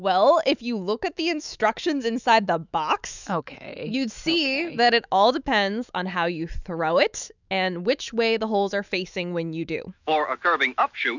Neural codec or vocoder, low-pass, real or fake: autoencoder, 48 kHz, 128 numbers a frame, DAC-VAE, trained on Japanese speech; 7.2 kHz; fake